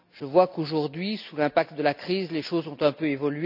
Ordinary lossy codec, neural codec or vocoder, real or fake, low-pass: none; none; real; 5.4 kHz